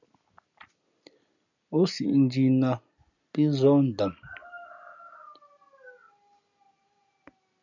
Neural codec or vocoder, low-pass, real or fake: none; 7.2 kHz; real